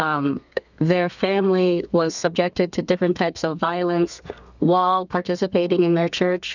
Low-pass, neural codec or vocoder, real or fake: 7.2 kHz; codec, 32 kHz, 1.9 kbps, SNAC; fake